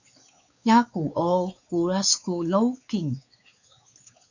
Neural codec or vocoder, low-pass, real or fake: codec, 16 kHz, 4 kbps, X-Codec, WavLM features, trained on Multilingual LibriSpeech; 7.2 kHz; fake